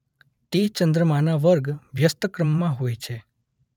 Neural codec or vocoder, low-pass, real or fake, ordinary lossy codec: vocoder, 44.1 kHz, 128 mel bands every 512 samples, BigVGAN v2; 19.8 kHz; fake; none